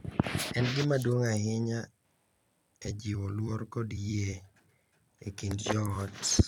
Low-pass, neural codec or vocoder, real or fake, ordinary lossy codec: 19.8 kHz; vocoder, 44.1 kHz, 128 mel bands every 256 samples, BigVGAN v2; fake; none